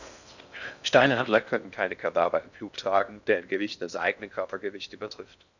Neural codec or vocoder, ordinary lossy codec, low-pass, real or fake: codec, 16 kHz in and 24 kHz out, 0.6 kbps, FocalCodec, streaming, 4096 codes; none; 7.2 kHz; fake